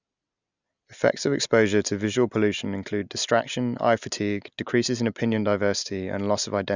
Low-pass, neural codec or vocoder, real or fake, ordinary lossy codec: 7.2 kHz; none; real; none